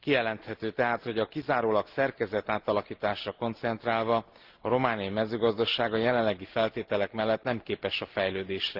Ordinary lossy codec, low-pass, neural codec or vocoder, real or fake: Opus, 16 kbps; 5.4 kHz; none; real